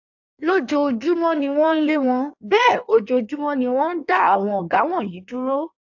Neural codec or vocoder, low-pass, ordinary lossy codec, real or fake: codec, 16 kHz in and 24 kHz out, 1.1 kbps, FireRedTTS-2 codec; 7.2 kHz; AAC, 48 kbps; fake